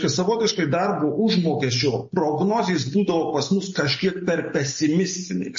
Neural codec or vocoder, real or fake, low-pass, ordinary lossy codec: none; real; 7.2 kHz; MP3, 32 kbps